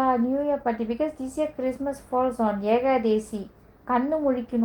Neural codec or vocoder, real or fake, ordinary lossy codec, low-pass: none; real; Opus, 24 kbps; 19.8 kHz